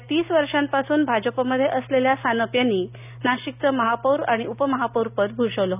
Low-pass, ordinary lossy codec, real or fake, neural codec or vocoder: 3.6 kHz; none; real; none